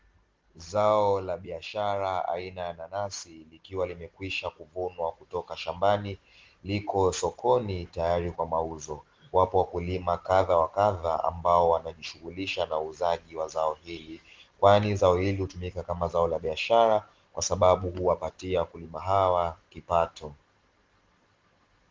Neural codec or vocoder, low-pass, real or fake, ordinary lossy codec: none; 7.2 kHz; real; Opus, 16 kbps